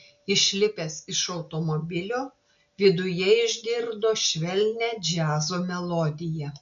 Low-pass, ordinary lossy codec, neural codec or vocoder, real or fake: 7.2 kHz; MP3, 64 kbps; none; real